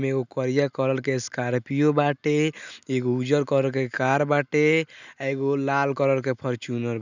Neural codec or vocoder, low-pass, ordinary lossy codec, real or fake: none; 7.2 kHz; none; real